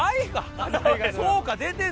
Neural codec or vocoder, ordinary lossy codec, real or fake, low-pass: none; none; real; none